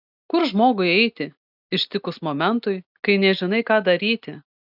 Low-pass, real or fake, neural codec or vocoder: 5.4 kHz; real; none